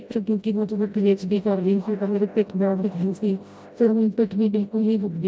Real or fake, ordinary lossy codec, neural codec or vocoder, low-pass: fake; none; codec, 16 kHz, 0.5 kbps, FreqCodec, smaller model; none